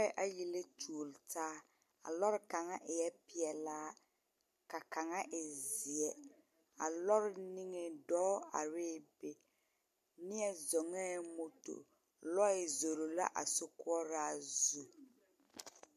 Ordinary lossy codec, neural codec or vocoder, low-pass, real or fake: MP3, 96 kbps; none; 14.4 kHz; real